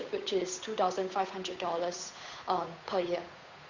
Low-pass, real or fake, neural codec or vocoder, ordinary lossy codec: 7.2 kHz; fake; codec, 16 kHz, 8 kbps, FunCodec, trained on Chinese and English, 25 frames a second; Opus, 64 kbps